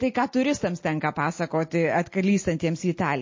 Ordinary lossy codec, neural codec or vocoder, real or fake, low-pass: MP3, 32 kbps; none; real; 7.2 kHz